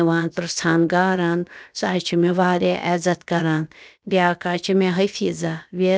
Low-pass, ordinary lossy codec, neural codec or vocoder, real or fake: none; none; codec, 16 kHz, about 1 kbps, DyCAST, with the encoder's durations; fake